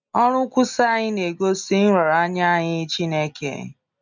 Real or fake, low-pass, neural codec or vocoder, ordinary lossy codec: real; 7.2 kHz; none; none